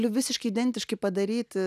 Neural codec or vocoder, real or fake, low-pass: none; real; 14.4 kHz